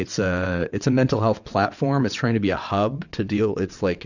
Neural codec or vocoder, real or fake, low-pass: vocoder, 22.05 kHz, 80 mel bands, WaveNeXt; fake; 7.2 kHz